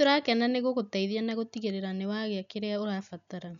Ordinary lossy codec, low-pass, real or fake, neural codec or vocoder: none; 7.2 kHz; real; none